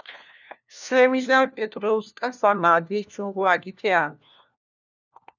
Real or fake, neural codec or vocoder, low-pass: fake; codec, 16 kHz, 1 kbps, FunCodec, trained on LibriTTS, 50 frames a second; 7.2 kHz